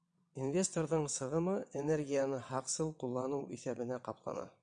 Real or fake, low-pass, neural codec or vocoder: fake; 9.9 kHz; vocoder, 22.05 kHz, 80 mel bands, WaveNeXt